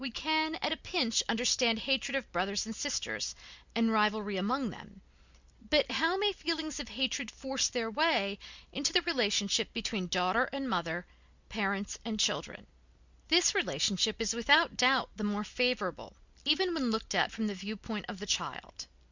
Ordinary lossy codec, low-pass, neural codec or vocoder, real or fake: Opus, 64 kbps; 7.2 kHz; none; real